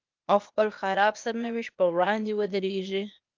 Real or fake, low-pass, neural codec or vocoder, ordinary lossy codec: fake; 7.2 kHz; codec, 16 kHz, 0.8 kbps, ZipCodec; Opus, 32 kbps